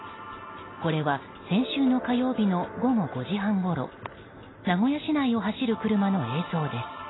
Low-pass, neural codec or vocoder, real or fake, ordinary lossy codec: 7.2 kHz; none; real; AAC, 16 kbps